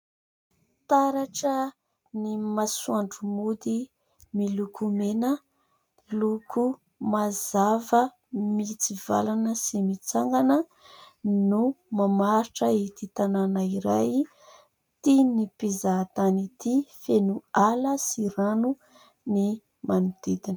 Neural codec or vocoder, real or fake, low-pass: none; real; 19.8 kHz